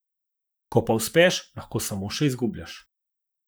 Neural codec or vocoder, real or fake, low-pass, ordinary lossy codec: vocoder, 44.1 kHz, 128 mel bands, Pupu-Vocoder; fake; none; none